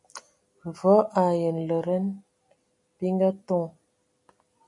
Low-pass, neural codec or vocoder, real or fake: 10.8 kHz; none; real